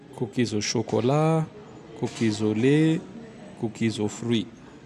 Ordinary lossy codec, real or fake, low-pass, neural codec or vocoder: none; real; 14.4 kHz; none